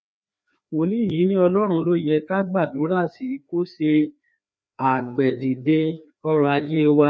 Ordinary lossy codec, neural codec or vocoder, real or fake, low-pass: none; codec, 16 kHz, 2 kbps, FreqCodec, larger model; fake; none